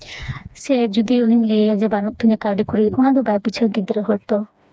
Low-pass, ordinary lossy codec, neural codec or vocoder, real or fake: none; none; codec, 16 kHz, 2 kbps, FreqCodec, smaller model; fake